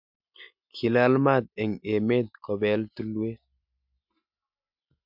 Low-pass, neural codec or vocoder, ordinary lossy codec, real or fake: 5.4 kHz; none; MP3, 48 kbps; real